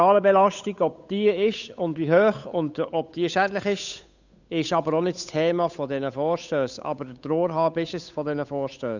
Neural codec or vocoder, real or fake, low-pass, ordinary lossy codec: codec, 16 kHz, 8 kbps, FunCodec, trained on Chinese and English, 25 frames a second; fake; 7.2 kHz; none